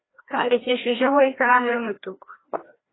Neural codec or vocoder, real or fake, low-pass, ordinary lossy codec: codec, 16 kHz, 1 kbps, FreqCodec, larger model; fake; 7.2 kHz; AAC, 16 kbps